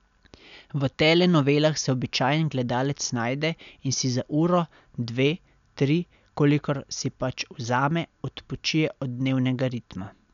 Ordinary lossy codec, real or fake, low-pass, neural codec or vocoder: none; real; 7.2 kHz; none